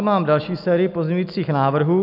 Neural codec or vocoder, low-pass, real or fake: none; 5.4 kHz; real